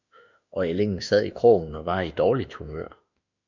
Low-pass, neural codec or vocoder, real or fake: 7.2 kHz; autoencoder, 48 kHz, 32 numbers a frame, DAC-VAE, trained on Japanese speech; fake